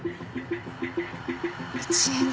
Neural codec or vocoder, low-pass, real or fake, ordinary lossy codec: none; none; real; none